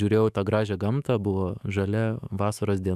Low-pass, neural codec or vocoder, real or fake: 14.4 kHz; autoencoder, 48 kHz, 128 numbers a frame, DAC-VAE, trained on Japanese speech; fake